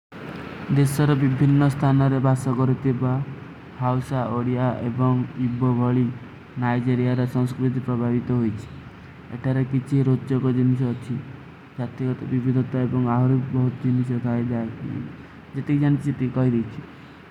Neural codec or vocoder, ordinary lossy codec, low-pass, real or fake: none; none; 19.8 kHz; real